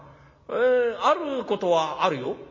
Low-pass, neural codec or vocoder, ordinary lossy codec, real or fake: 7.2 kHz; none; MP3, 32 kbps; real